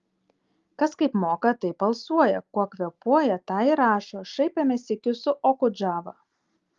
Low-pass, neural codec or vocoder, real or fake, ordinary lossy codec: 7.2 kHz; none; real; Opus, 32 kbps